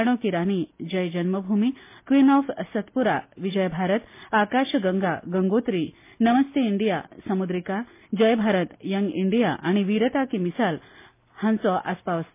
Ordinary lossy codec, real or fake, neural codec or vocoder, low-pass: MP3, 24 kbps; real; none; 3.6 kHz